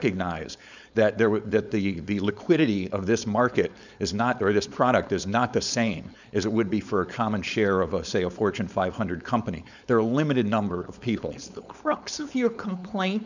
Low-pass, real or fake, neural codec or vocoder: 7.2 kHz; fake; codec, 16 kHz, 4.8 kbps, FACodec